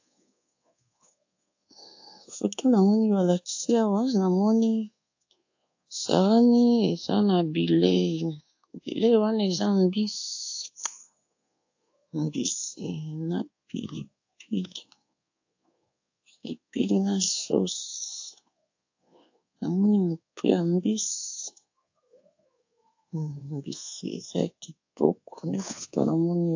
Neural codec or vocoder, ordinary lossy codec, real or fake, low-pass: codec, 24 kHz, 1.2 kbps, DualCodec; AAC, 48 kbps; fake; 7.2 kHz